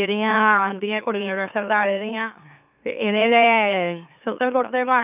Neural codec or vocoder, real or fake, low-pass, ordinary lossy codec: autoencoder, 44.1 kHz, a latent of 192 numbers a frame, MeloTTS; fake; 3.6 kHz; none